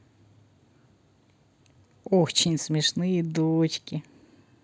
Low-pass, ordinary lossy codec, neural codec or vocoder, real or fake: none; none; none; real